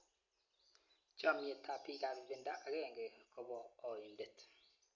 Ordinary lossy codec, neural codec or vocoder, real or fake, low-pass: MP3, 48 kbps; none; real; 7.2 kHz